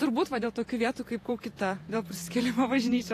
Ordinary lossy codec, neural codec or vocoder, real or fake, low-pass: AAC, 48 kbps; vocoder, 48 kHz, 128 mel bands, Vocos; fake; 14.4 kHz